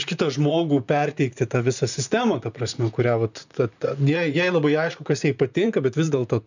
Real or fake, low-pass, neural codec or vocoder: fake; 7.2 kHz; vocoder, 44.1 kHz, 128 mel bands, Pupu-Vocoder